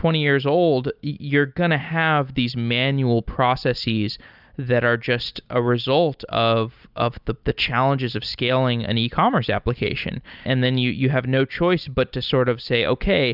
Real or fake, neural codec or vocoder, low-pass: real; none; 5.4 kHz